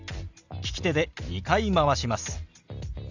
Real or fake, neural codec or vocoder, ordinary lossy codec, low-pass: real; none; none; 7.2 kHz